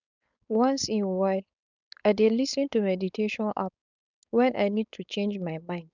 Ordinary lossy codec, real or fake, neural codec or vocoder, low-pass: none; fake; codec, 16 kHz, 4.8 kbps, FACodec; 7.2 kHz